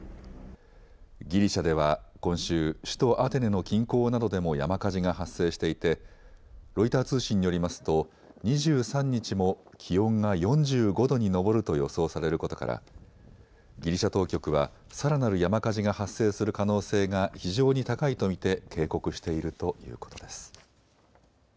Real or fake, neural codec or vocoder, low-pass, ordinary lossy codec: real; none; none; none